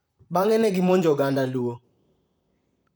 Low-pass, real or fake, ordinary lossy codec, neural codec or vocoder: none; fake; none; vocoder, 44.1 kHz, 128 mel bands, Pupu-Vocoder